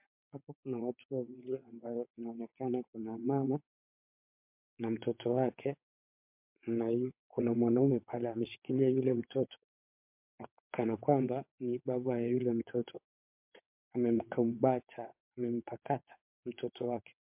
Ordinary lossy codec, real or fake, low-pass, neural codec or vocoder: MP3, 32 kbps; fake; 3.6 kHz; vocoder, 22.05 kHz, 80 mel bands, WaveNeXt